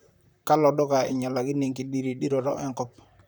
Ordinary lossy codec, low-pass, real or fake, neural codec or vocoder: none; none; fake; vocoder, 44.1 kHz, 128 mel bands, Pupu-Vocoder